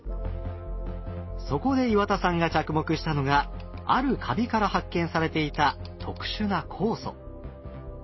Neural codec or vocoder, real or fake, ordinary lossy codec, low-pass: none; real; MP3, 24 kbps; 7.2 kHz